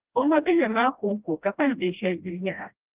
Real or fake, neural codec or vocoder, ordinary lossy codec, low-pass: fake; codec, 16 kHz, 1 kbps, FreqCodec, smaller model; Opus, 32 kbps; 3.6 kHz